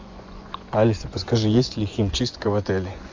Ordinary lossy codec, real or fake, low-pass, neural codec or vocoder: MP3, 48 kbps; fake; 7.2 kHz; vocoder, 24 kHz, 100 mel bands, Vocos